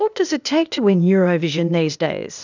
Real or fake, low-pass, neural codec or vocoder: fake; 7.2 kHz; codec, 16 kHz, 0.8 kbps, ZipCodec